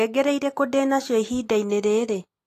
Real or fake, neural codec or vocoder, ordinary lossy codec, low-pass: real; none; AAC, 48 kbps; 14.4 kHz